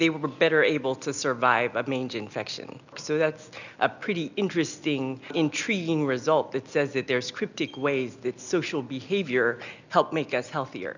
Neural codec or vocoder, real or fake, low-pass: none; real; 7.2 kHz